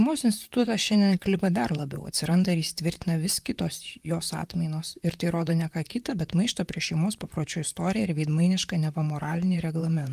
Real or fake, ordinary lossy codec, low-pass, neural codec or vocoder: fake; Opus, 32 kbps; 14.4 kHz; vocoder, 44.1 kHz, 128 mel bands, Pupu-Vocoder